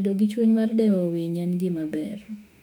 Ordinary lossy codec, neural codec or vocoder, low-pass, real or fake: none; autoencoder, 48 kHz, 32 numbers a frame, DAC-VAE, trained on Japanese speech; 19.8 kHz; fake